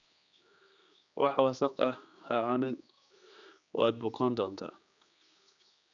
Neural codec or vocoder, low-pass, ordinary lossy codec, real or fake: codec, 16 kHz, 2 kbps, X-Codec, HuBERT features, trained on general audio; 7.2 kHz; none; fake